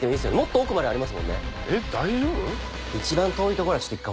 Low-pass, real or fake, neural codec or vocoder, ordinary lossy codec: none; real; none; none